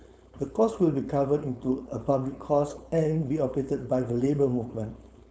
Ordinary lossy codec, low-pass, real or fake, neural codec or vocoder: none; none; fake; codec, 16 kHz, 4.8 kbps, FACodec